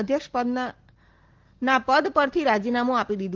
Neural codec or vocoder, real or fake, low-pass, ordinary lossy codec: none; real; 7.2 kHz; Opus, 16 kbps